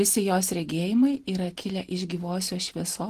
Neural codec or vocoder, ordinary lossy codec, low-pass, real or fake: none; Opus, 16 kbps; 14.4 kHz; real